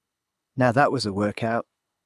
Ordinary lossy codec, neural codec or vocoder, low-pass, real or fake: none; codec, 24 kHz, 6 kbps, HILCodec; none; fake